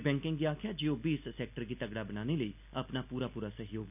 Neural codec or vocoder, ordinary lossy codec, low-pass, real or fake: none; none; 3.6 kHz; real